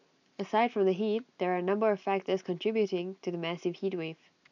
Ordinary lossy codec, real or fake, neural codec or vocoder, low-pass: none; real; none; 7.2 kHz